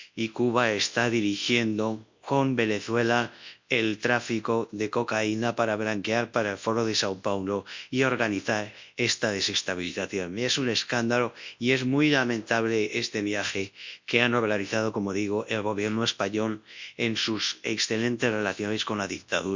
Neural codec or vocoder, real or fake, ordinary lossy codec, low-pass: codec, 24 kHz, 0.9 kbps, WavTokenizer, large speech release; fake; MP3, 64 kbps; 7.2 kHz